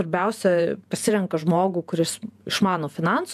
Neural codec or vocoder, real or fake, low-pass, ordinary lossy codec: vocoder, 48 kHz, 128 mel bands, Vocos; fake; 14.4 kHz; MP3, 96 kbps